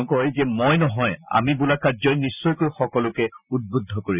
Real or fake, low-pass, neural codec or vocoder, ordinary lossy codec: real; 3.6 kHz; none; none